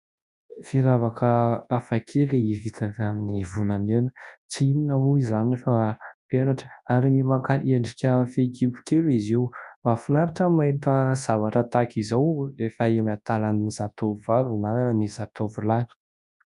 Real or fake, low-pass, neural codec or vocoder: fake; 10.8 kHz; codec, 24 kHz, 0.9 kbps, WavTokenizer, large speech release